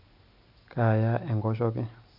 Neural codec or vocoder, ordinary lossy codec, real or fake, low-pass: none; none; real; 5.4 kHz